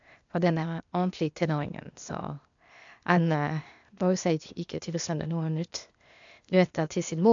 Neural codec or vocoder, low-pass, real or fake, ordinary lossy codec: codec, 16 kHz, 0.8 kbps, ZipCodec; 7.2 kHz; fake; MP3, 64 kbps